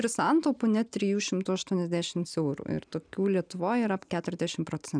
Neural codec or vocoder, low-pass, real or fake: none; 9.9 kHz; real